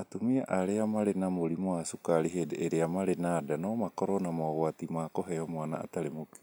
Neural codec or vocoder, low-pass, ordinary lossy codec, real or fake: none; none; none; real